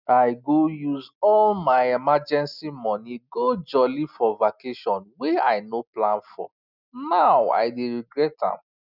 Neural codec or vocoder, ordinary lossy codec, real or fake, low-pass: none; none; real; 5.4 kHz